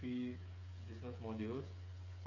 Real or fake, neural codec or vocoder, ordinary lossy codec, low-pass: fake; codec, 16 kHz, 8 kbps, FreqCodec, smaller model; none; 7.2 kHz